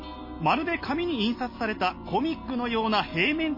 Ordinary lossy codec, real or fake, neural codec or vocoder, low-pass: MP3, 24 kbps; real; none; 5.4 kHz